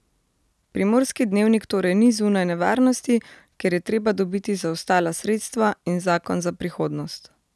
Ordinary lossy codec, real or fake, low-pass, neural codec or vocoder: none; real; none; none